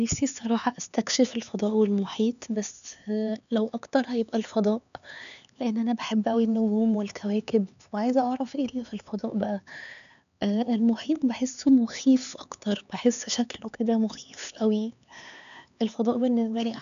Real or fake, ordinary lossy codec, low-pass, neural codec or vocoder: fake; none; 7.2 kHz; codec, 16 kHz, 4 kbps, X-Codec, HuBERT features, trained on LibriSpeech